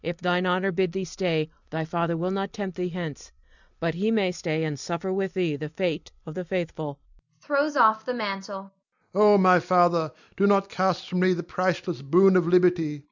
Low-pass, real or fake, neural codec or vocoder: 7.2 kHz; real; none